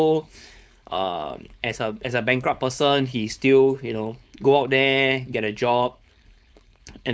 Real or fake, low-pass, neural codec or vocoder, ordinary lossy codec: fake; none; codec, 16 kHz, 4.8 kbps, FACodec; none